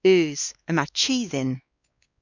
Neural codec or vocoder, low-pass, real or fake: codec, 16 kHz, 2 kbps, X-Codec, WavLM features, trained on Multilingual LibriSpeech; 7.2 kHz; fake